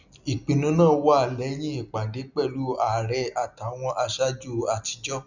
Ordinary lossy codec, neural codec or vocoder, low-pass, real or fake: none; none; 7.2 kHz; real